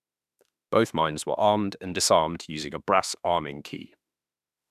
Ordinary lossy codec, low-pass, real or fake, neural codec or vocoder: none; 14.4 kHz; fake; autoencoder, 48 kHz, 32 numbers a frame, DAC-VAE, trained on Japanese speech